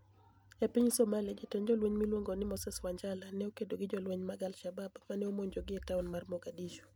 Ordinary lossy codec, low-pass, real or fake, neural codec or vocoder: none; none; real; none